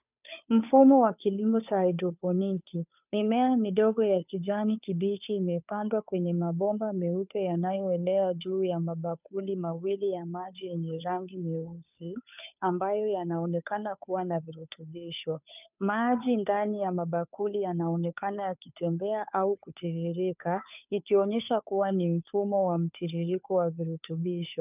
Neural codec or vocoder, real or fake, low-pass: codec, 16 kHz, 2 kbps, FunCodec, trained on Chinese and English, 25 frames a second; fake; 3.6 kHz